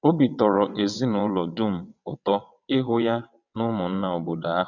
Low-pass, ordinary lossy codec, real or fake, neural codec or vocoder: 7.2 kHz; none; fake; vocoder, 22.05 kHz, 80 mel bands, WaveNeXt